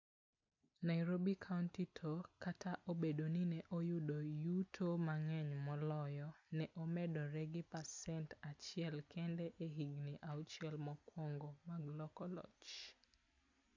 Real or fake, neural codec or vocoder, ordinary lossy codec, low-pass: real; none; AAC, 48 kbps; 7.2 kHz